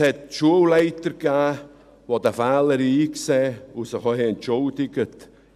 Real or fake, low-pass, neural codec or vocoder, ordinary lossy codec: real; 14.4 kHz; none; none